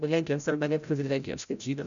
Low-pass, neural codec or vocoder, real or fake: 7.2 kHz; codec, 16 kHz, 0.5 kbps, FreqCodec, larger model; fake